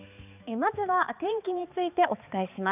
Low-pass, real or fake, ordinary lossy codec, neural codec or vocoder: 3.6 kHz; fake; none; codec, 16 kHz, 4 kbps, X-Codec, HuBERT features, trained on balanced general audio